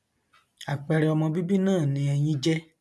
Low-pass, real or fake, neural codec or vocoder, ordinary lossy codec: none; real; none; none